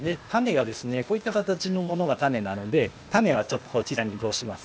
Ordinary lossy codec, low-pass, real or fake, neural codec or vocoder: none; none; fake; codec, 16 kHz, 0.8 kbps, ZipCodec